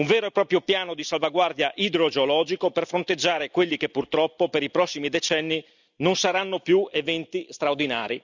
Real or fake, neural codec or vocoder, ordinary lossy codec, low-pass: real; none; none; 7.2 kHz